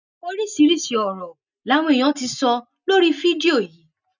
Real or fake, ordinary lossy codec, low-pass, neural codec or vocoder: real; none; 7.2 kHz; none